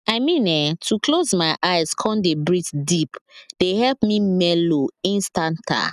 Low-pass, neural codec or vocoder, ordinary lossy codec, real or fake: 14.4 kHz; none; none; real